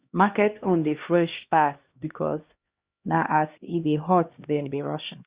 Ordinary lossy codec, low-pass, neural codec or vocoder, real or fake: Opus, 64 kbps; 3.6 kHz; codec, 16 kHz, 1 kbps, X-Codec, HuBERT features, trained on LibriSpeech; fake